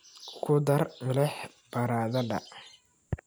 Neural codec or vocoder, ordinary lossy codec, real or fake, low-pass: none; none; real; none